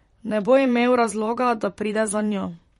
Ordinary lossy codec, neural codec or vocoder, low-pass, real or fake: MP3, 48 kbps; vocoder, 44.1 kHz, 128 mel bands, Pupu-Vocoder; 19.8 kHz; fake